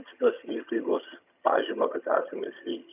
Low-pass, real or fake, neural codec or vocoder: 3.6 kHz; fake; vocoder, 22.05 kHz, 80 mel bands, HiFi-GAN